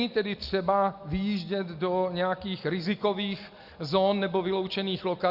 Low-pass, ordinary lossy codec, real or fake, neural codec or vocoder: 5.4 kHz; Opus, 64 kbps; real; none